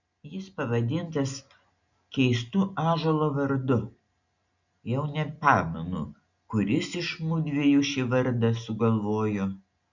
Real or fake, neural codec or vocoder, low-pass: real; none; 7.2 kHz